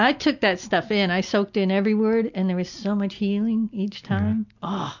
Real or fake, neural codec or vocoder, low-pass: real; none; 7.2 kHz